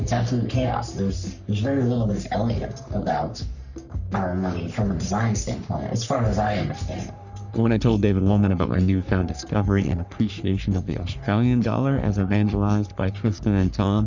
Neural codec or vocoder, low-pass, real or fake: codec, 44.1 kHz, 3.4 kbps, Pupu-Codec; 7.2 kHz; fake